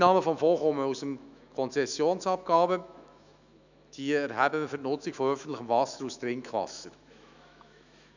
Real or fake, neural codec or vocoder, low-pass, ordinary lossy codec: fake; autoencoder, 48 kHz, 128 numbers a frame, DAC-VAE, trained on Japanese speech; 7.2 kHz; none